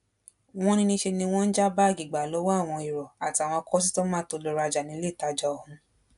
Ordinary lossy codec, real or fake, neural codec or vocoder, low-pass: AAC, 96 kbps; real; none; 10.8 kHz